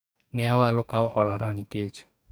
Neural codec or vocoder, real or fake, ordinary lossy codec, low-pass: codec, 44.1 kHz, 2.6 kbps, DAC; fake; none; none